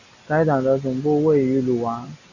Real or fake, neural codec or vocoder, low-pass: real; none; 7.2 kHz